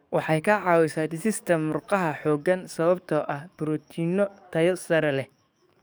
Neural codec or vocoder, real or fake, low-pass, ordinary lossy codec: codec, 44.1 kHz, 7.8 kbps, DAC; fake; none; none